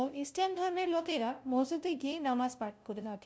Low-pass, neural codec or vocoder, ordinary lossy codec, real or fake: none; codec, 16 kHz, 0.5 kbps, FunCodec, trained on LibriTTS, 25 frames a second; none; fake